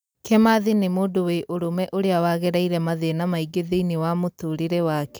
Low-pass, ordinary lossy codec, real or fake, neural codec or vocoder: none; none; real; none